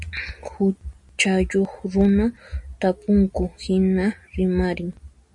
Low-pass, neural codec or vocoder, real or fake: 10.8 kHz; none; real